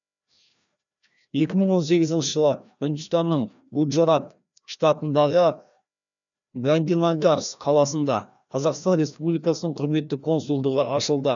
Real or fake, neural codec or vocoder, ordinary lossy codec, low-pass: fake; codec, 16 kHz, 1 kbps, FreqCodec, larger model; none; 7.2 kHz